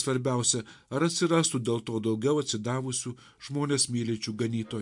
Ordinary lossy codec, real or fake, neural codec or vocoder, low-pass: MP3, 64 kbps; real; none; 10.8 kHz